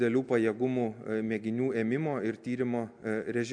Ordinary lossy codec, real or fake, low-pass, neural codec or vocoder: MP3, 64 kbps; real; 9.9 kHz; none